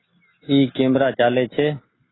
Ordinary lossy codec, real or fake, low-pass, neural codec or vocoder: AAC, 16 kbps; real; 7.2 kHz; none